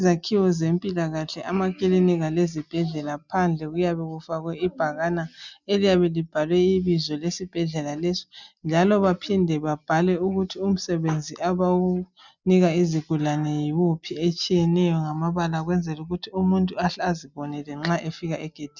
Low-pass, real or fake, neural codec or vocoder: 7.2 kHz; real; none